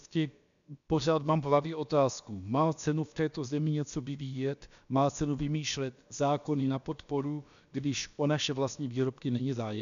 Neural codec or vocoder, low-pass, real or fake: codec, 16 kHz, about 1 kbps, DyCAST, with the encoder's durations; 7.2 kHz; fake